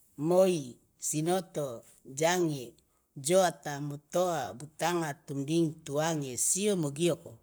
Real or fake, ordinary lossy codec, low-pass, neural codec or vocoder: fake; none; none; codec, 44.1 kHz, 7.8 kbps, Pupu-Codec